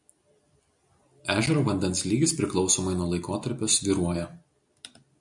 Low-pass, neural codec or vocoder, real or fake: 10.8 kHz; none; real